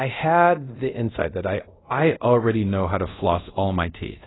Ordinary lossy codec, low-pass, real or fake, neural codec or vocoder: AAC, 16 kbps; 7.2 kHz; fake; codec, 24 kHz, 0.5 kbps, DualCodec